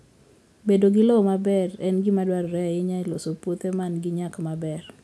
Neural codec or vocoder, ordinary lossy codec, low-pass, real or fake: none; none; none; real